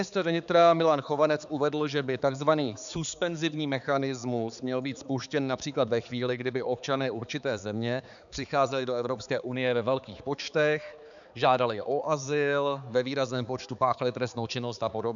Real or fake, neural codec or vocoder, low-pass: fake; codec, 16 kHz, 4 kbps, X-Codec, HuBERT features, trained on balanced general audio; 7.2 kHz